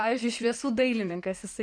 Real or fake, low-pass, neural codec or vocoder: fake; 9.9 kHz; codec, 16 kHz in and 24 kHz out, 2.2 kbps, FireRedTTS-2 codec